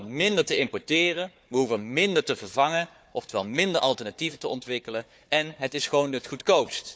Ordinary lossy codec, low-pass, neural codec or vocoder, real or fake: none; none; codec, 16 kHz, 4 kbps, FunCodec, trained on Chinese and English, 50 frames a second; fake